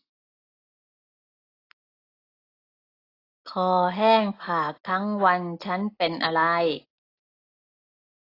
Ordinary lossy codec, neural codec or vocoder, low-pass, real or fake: AAC, 32 kbps; none; 5.4 kHz; real